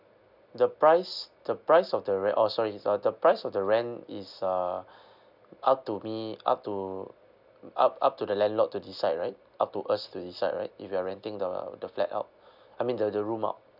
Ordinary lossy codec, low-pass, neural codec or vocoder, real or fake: none; 5.4 kHz; none; real